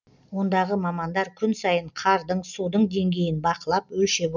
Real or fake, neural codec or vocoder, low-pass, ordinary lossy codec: real; none; 7.2 kHz; none